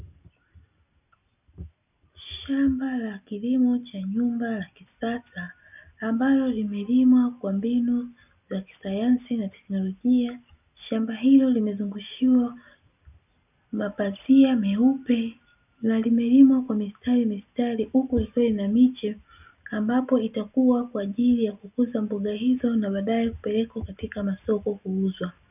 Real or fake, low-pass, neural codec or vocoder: real; 3.6 kHz; none